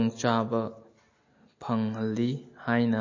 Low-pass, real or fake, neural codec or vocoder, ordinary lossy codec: 7.2 kHz; real; none; MP3, 32 kbps